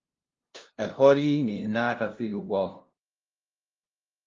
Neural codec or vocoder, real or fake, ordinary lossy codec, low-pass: codec, 16 kHz, 0.5 kbps, FunCodec, trained on LibriTTS, 25 frames a second; fake; Opus, 32 kbps; 7.2 kHz